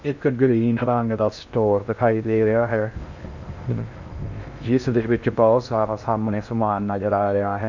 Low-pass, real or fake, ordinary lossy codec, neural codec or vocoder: 7.2 kHz; fake; none; codec, 16 kHz in and 24 kHz out, 0.6 kbps, FocalCodec, streaming, 2048 codes